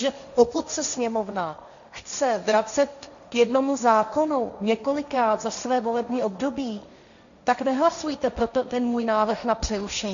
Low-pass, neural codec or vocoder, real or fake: 7.2 kHz; codec, 16 kHz, 1.1 kbps, Voila-Tokenizer; fake